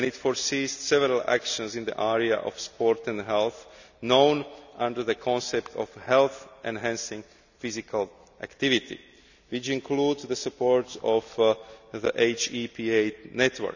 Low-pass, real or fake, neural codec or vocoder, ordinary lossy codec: 7.2 kHz; real; none; none